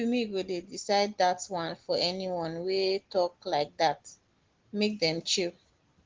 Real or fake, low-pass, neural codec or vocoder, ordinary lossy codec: real; 7.2 kHz; none; Opus, 16 kbps